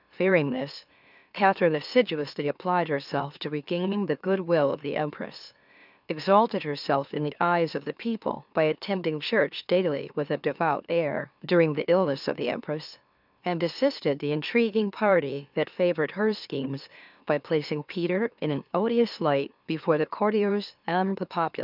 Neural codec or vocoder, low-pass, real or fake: autoencoder, 44.1 kHz, a latent of 192 numbers a frame, MeloTTS; 5.4 kHz; fake